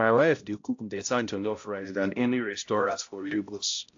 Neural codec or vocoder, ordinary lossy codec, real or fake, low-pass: codec, 16 kHz, 0.5 kbps, X-Codec, HuBERT features, trained on balanced general audio; AAC, 48 kbps; fake; 7.2 kHz